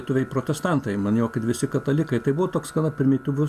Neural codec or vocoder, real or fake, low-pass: none; real; 14.4 kHz